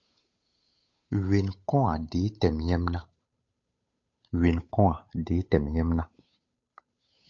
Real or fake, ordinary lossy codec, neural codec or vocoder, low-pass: fake; MP3, 48 kbps; codec, 16 kHz, 8 kbps, FunCodec, trained on Chinese and English, 25 frames a second; 7.2 kHz